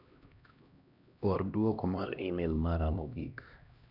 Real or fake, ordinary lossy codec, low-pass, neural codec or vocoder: fake; none; 5.4 kHz; codec, 16 kHz, 1 kbps, X-Codec, HuBERT features, trained on LibriSpeech